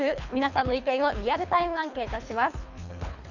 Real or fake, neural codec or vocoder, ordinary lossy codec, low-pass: fake; codec, 24 kHz, 3 kbps, HILCodec; none; 7.2 kHz